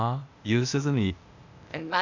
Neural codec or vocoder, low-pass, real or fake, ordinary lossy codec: codec, 16 kHz, 0.8 kbps, ZipCodec; 7.2 kHz; fake; none